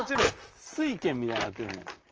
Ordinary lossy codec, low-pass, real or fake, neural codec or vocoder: Opus, 24 kbps; 7.2 kHz; fake; vocoder, 44.1 kHz, 128 mel bands, Pupu-Vocoder